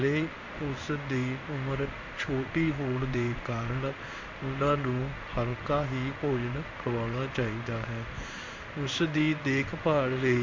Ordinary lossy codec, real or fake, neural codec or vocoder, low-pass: MP3, 64 kbps; fake; codec, 16 kHz in and 24 kHz out, 1 kbps, XY-Tokenizer; 7.2 kHz